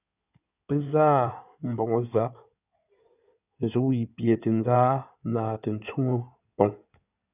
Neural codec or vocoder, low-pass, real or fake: codec, 16 kHz in and 24 kHz out, 2.2 kbps, FireRedTTS-2 codec; 3.6 kHz; fake